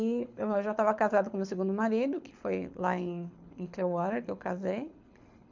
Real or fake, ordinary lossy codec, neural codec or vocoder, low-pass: fake; MP3, 64 kbps; codec, 24 kHz, 6 kbps, HILCodec; 7.2 kHz